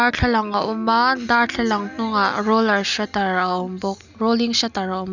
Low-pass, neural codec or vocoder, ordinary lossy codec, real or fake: 7.2 kHz; none; none; real